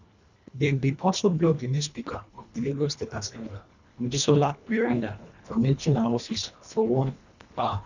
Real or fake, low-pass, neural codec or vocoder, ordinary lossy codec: fake; 7.2 kHz; codec, 24 kHz, 1.5 kbps, HILCodec; none